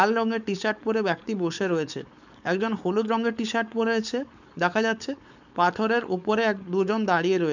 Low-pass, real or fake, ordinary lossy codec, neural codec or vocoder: 7.2 kHz; fake; none; codec, 16 kHz, 4.8 kbps, FACodec